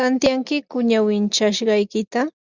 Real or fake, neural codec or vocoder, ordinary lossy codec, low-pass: real; none; Opus, 64 kbps; 7.2 kHz